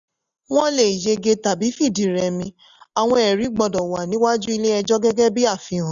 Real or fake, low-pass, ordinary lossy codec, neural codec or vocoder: real; 7.2 kHz; none; none